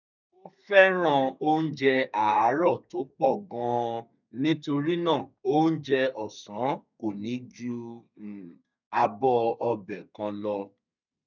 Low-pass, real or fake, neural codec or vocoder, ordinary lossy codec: 7.2 kHz; fake; codec, 32 kHz, 1.9 kbps, SNAC; none